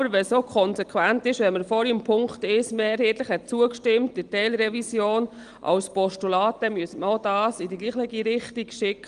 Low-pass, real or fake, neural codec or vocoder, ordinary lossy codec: 9.9 kHz; real; none; Opus, 24 kbps